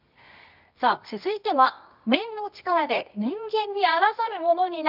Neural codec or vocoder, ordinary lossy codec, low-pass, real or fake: codec, 24 kHz, 0.9 kbps, WavTokenizer, medium music audio release; none; 5.4 kHz; fake